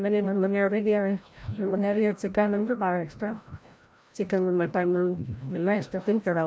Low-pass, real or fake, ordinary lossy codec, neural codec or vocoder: none; fake; none; codec, 16 kHz, 0.5 kbps, FreqCodec, larger model